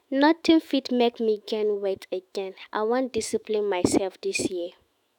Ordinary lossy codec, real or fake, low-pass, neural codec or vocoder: none; fake; 19.8 kHz; autoencoder, 48 kHz, 128 numbers a frame, DAC-VAE, trained on Japanese speech